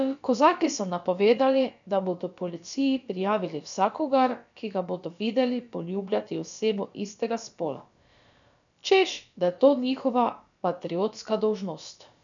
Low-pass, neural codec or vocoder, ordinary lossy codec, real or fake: 7.2 kHz; codec, 16 kHz, about 1 kbps, DyCAST, with the encoder's durations; none; fake